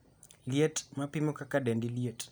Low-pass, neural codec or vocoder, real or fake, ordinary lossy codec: none; none; real; none